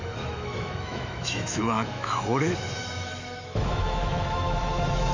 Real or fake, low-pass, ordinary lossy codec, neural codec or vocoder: fake; 7.2 kHz; MP3, 48 kbps; autoencoder, 48 kHz, 128 numbers a frame, DAC-VAE, trained on Japanese speech